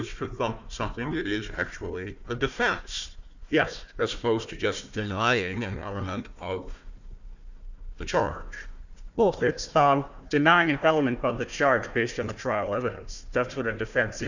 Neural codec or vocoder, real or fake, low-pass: codec, 16 kHz, 1 kbps, FunCodec, trained on Chinese and English, 50 frames a second; fake; 7.2 kHz